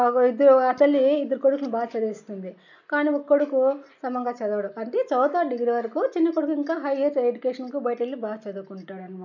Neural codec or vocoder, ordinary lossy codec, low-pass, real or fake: none; none; 7.2 kHz; real